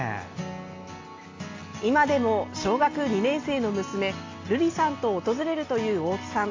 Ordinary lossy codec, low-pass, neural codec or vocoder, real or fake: AAC, 32 kbps; 7.2 kHz; none; real